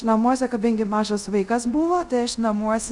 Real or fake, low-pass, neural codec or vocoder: fake; 10.8 kHz; codec, 24 kHz, 0.5 kbps, DualCodec